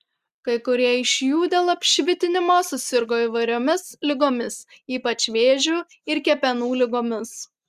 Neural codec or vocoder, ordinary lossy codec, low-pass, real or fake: none; Opus, 64 kbps; 14.4 kHz; real